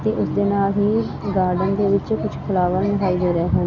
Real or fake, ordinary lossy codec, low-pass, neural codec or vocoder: real; none; 7.2 kHz; none